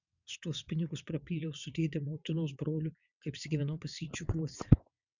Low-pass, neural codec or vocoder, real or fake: 7.2 kHz; vocoder, 22.05 kHz, 80 mel bands, WaveNeXt; fake